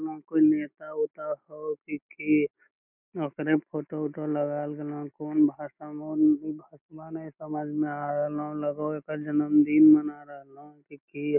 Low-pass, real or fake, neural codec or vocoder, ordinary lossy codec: 3.6 kHz; real; none; none